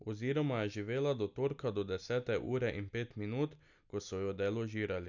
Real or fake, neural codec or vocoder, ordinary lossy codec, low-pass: real; none; none; 7.2 kHz